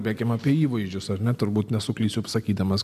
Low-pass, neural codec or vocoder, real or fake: 14.4 kHz; none; real